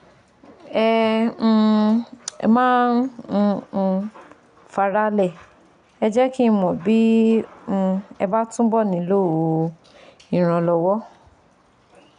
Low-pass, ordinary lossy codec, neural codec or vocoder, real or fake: 9.9 kHz; none; none; real